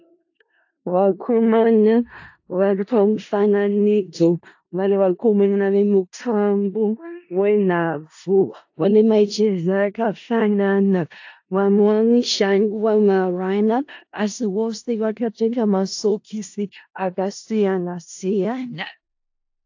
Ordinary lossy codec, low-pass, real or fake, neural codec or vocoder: AAC, 48 kbps; 7.2 kHz; fake; codec, 16 kHz in and 24 kHz out, 0.4 kbps, LongCat-Audio-Codec, four codebook decoder